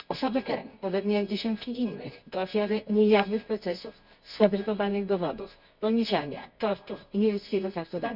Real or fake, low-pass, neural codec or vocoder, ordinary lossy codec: fake; 5.4 kHz; codec, 24 kHz, 0.9 kbps, WavTokenizer, medium music audio release; none